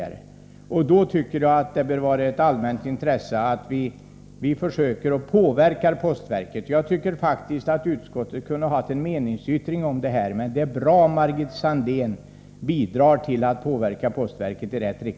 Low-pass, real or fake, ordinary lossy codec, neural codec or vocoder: none; real; none; none